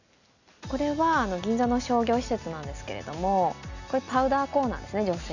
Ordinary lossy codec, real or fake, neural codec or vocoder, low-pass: none; real; none; 7.2 kHz